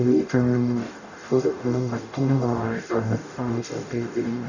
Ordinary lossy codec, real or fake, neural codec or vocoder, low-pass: none; fake; codec, 44.1 kHz, 0.9 kbps, DAC; 7.2 kHz